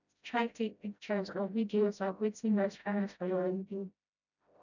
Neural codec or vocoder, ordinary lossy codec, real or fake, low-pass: codec, 16 kHz, 0.5 kbps, FreqCodec, smaller model; none; fake; 7.2 kHz